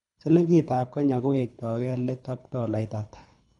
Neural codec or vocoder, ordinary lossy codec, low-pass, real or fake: codec, 24 kHz, 3 kbps, HILCodec; none; 10.8 kHz; fake